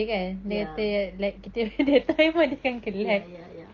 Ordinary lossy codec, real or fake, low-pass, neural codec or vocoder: Opus, 32 kbps; real; 7.2 kHz; none